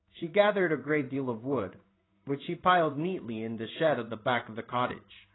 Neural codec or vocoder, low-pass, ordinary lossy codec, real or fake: codec, 16 kHz in and 24 kHz out, 1 kbps, XY-Tokenizer; 7.2 kHz; AAC, 16 kbps; fake